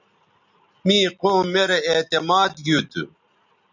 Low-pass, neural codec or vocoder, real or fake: 7.2 kHz; none; real